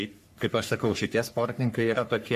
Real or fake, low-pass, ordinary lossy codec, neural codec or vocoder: fake; 14.4 kHz; MP3, 64 kbps; codec, 44.1 kHz, 3.4 kbps, Pupu-Codec